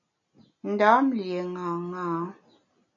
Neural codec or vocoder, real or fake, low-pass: none; real; 7.2 kHz